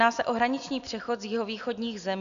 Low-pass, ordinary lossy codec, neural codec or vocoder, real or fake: 7.2 kHz; MP3, 96 kbps; none; real